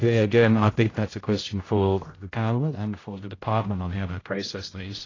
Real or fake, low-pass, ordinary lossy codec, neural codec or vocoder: fake; 7.2 kHz; AAC, 32 kbps; codec, 16 kHz, 0.5 kbps, X-Codec, HuBERT features, trained on general audio